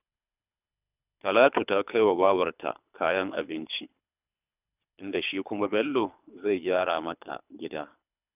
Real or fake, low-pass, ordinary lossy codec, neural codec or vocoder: fake; 3.6 kHz; none; codec, 24 kHz, 3 kbps, HILCodec